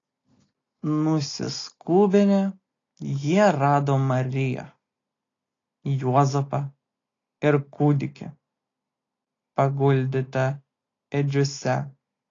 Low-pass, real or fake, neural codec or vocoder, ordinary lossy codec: 7.2 kHz; real; none; AAC, 32 kbps